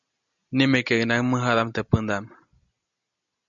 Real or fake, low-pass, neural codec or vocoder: real; 7.2 kHz; none